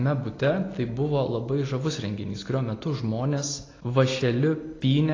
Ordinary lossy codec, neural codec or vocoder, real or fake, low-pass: AAC, 32 kbps; none; real; 7.2 kHz